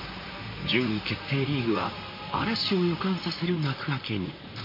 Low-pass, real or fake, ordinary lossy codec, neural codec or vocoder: 5.4 kHz; fake; MP3, 32 kbps; vocoder, 44.1 kHz, 128 mel bands, Pupu-Vocoder